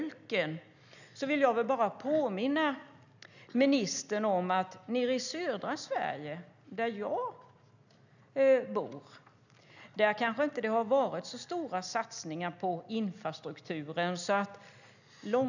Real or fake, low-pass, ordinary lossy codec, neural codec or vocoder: real; 7.2 kHz; none; none